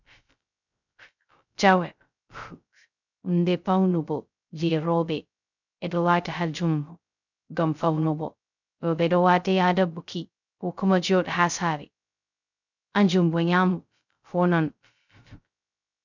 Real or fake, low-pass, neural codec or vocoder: fake; 7.2 kHz; codec, 16 kHz, 0.2 kbps, FocalCodec